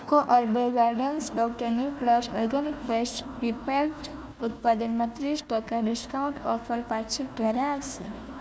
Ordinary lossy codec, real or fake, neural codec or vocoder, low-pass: none; fake; codec, 16 kHz, 1 kbps, FunCodec, trained on Chinese and English, 50 frames a second; none